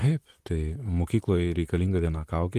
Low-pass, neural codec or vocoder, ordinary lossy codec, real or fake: 14.4 kHz; vocoder, 44.1 kHz, 128 mel bands, Pupu-Vocoder; Opus, 24 kbps; fake